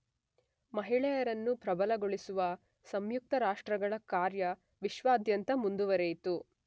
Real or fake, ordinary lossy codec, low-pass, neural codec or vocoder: real; none; none; none